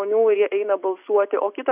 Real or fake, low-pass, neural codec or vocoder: real; 3.6 kHz; none